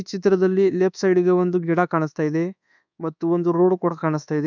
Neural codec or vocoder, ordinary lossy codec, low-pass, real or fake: codec, 24 kHz, 1.2 kbps, DualCodec; none; 7.2 kHz; fake